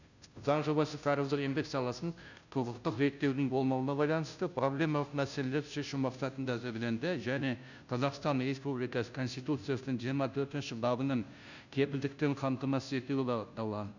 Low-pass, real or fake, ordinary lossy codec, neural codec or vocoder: 7.2 kHz; fake; none; codec, 16 kHz, 0.5 kbps, FunCodec, trained on Chinese and English, 25 frames a second